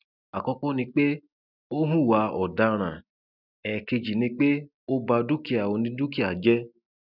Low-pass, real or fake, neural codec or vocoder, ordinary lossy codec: 5.4 kHz; real; none; none